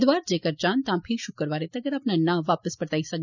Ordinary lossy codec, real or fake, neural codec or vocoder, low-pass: none; real; none; 7.2 kHz